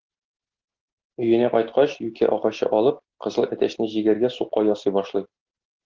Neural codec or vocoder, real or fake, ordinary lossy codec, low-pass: none; real; Opus, 16 kbps; 7.2 kHz